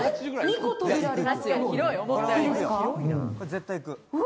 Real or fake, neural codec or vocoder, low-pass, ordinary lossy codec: real; none; none; none